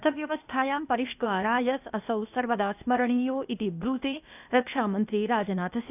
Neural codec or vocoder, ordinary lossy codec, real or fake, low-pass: codec, 16 kHz, 0.8 kbps, ZipCodec; none; fake; 3.6 kHz